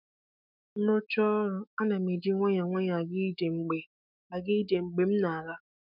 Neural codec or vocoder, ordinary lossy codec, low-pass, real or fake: autoencoder, 48 kHz, 128 numbers a frame, DAC-VAE, trained on Japanese speech; none; 5.4 kHz; fake